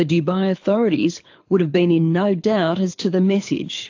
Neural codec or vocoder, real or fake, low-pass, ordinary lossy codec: codec, 24 kHz, 6 kbps, HILCodec; fake; 7.2 kHz; AAC, 48 kbps